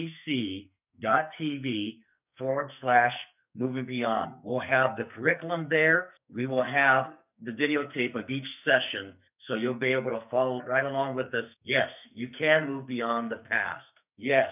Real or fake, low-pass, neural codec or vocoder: fake; 3.6 kHz; codec, 44.1 kHz, 2.6 kbps, SNAC